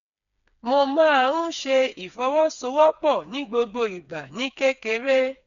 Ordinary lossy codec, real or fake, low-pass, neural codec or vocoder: none; fake; 7.2 kHz; codec, 16 kHz, 4 kbps, FreqCodec, smaller model